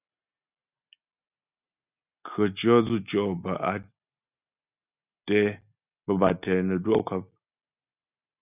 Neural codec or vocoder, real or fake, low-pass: none; real; 3.6 kHz